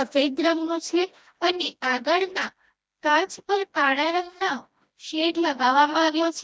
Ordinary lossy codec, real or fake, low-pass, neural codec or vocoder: none; fake; none; codec, 16 kHz, 1 kbps, FreqCodec, smaller model